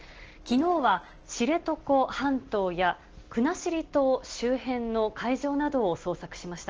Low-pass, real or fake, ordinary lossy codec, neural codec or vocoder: 7.2 kHz; real; Opus, 16 kbps; none